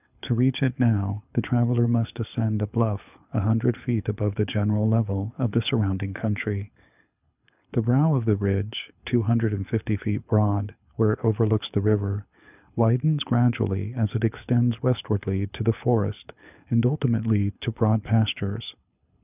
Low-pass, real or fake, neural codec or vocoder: 3.6 kHz; fake; codec, 24 kHz, 6 kbps, HILCodec